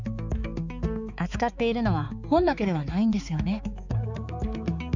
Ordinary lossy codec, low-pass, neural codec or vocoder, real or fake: none; 7.2 kHz; codec, 16 kHz, 4 kbps, X-Codec, HuBERT features, trained on balanced general audio; fake